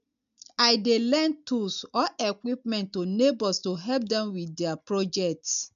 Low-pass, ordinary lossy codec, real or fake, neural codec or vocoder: 7.2 kHz; none; real; none